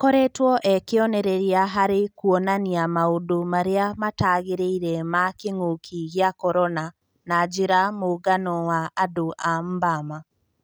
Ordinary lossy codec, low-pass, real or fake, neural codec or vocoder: none; none; real; none